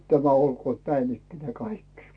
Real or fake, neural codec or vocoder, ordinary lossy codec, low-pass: real; none; AAC, 48 kbps; 9.9 kHz